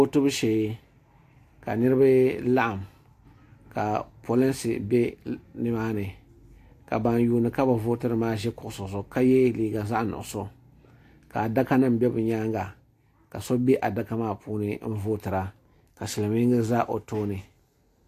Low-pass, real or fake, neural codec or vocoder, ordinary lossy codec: 14.4 kHz; real; none; AAC, 64 kbps